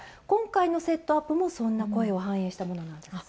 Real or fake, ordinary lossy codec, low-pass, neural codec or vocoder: real; none; none; none